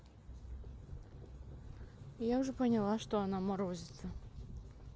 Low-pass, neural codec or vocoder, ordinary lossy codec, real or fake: none; none; none; real